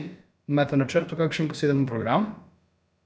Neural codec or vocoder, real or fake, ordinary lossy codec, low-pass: codec, 16 kHz, about 1 kbps, DyCAST, with the encoder's durations; fake; none; none